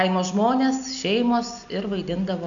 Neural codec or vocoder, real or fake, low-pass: none; real; 7.2 kHz